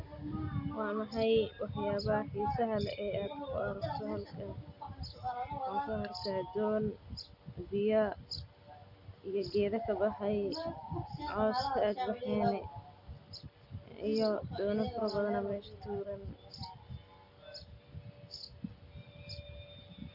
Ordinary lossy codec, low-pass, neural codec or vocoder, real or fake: none; 5.4 kHz; none; real